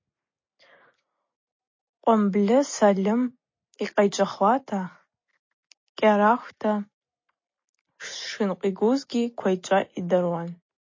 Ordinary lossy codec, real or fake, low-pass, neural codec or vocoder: MP3, 32 kbps; real; 7.2 kHz; none